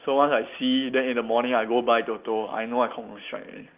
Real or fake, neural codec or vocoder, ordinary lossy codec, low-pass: real; none; Opus, 24 kbps; 3.6 kHz